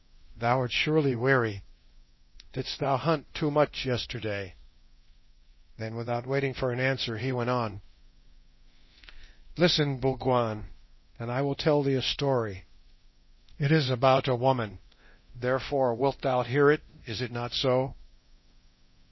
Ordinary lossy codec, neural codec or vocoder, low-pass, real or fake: MP3, 24 kbps; codec, 24 kHz, 0.9 kbps, DualCodec; 7.2 kHz; fake